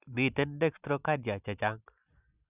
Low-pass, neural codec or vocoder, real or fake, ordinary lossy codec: 3.6 kHz; none; real; none